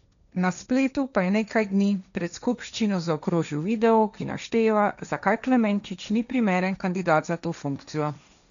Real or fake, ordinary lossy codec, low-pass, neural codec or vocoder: fake; none; 7.2 kHz; codec, 16 kHz, 1.1 kbps, Voila-Tokenizer